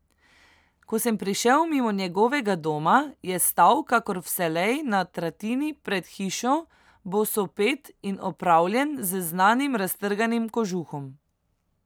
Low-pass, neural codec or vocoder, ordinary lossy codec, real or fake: none; none; none; real